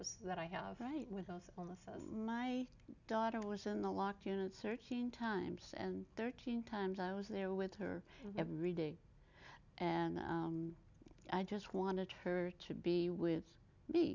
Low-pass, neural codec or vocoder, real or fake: 7.2 kHz; none; real